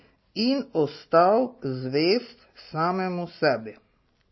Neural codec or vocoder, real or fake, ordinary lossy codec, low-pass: none; real; MP3, 24 kbps; 7.2 kHz